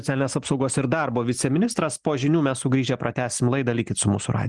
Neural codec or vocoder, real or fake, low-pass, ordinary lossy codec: none; real; 10.8 kHz; Opus, 24 kbps